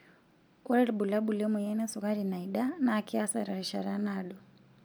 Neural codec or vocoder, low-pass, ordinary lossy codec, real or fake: none; none; none; real